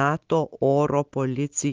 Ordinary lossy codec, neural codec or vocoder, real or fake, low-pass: Opus, 16 kbps; none; real; 7.2 kHz